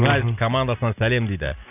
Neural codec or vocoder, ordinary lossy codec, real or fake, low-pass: none; none; real; 3.6 kHz